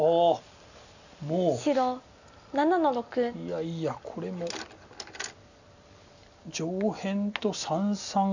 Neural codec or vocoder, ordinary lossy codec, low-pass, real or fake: none; none; 7.2 kHz; real